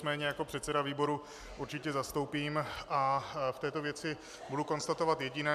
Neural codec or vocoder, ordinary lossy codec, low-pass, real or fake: none; MP3, 96 kbps; 14.4 kHz; real